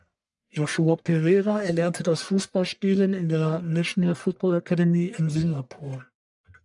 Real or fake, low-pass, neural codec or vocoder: fake; 10.8 kHz; codec, 44.1 kHz, 1.7 kbps, Pupu-Codec